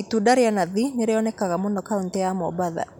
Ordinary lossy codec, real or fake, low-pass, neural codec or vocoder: none; real; 19.8 kHz; none